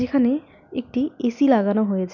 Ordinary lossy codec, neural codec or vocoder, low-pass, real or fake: none; none; 7.2 kHz; real